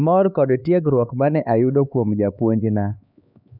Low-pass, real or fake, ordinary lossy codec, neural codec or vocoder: 5.4 kHz; fake; none; codec, 16 kHz, 4 kbps, X-Codec, HuBERT features, trained on LibriSpeech